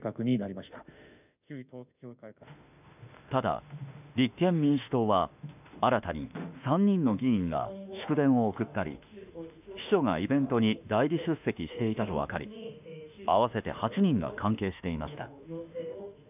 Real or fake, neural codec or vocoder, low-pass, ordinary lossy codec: fake; autoencoder, 48 kHz, 32 numbers a frame, DAC-VAE, trained on Japanese speech; 3.6 kHz; none